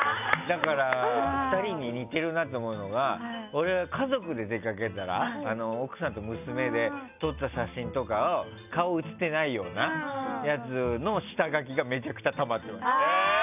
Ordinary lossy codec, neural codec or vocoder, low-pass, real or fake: none; none; 3.6 kHz; real